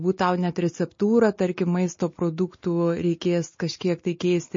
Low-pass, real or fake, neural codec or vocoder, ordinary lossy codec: 7.2 kHz; real; none; MP3, 32 kbps